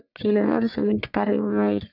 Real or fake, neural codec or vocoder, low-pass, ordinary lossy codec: fake; codec, 44.1 kHz, 1.7 kbps, Pupu-Codec; 5.4 kHz; none